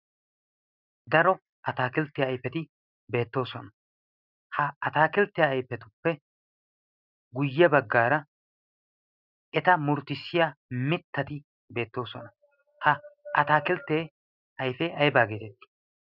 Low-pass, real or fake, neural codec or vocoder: 5.4 kHz; real; none